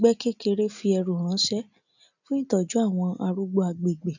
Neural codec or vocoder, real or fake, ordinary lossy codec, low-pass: none; real; none; 7.2 kHz